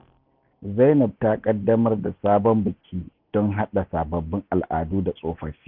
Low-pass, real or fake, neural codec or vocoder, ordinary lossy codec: 5.4 kHz; real; none; none